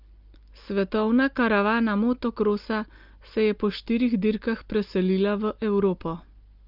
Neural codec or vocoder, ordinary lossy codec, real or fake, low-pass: none; Opus, 32 kbps; real; 5.4 kHz